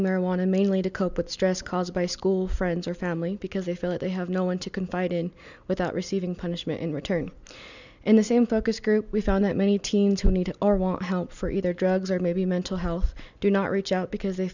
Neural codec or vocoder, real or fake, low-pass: none; real; 7.2 kHz